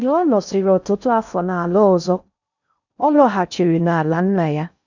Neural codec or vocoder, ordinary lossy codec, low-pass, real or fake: codec, 16 kHz in and 24 kHz out, 0.6 kbps, FocalCodec, streaming, 4096 codes; none; 7.2 kHz; fake